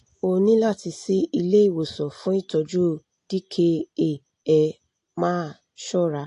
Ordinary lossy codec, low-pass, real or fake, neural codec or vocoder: AAC, 48 kbps; 10.8 kHz; real; none